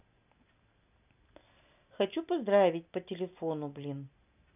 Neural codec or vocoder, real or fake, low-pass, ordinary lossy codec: none; real; 3.6 kHz; none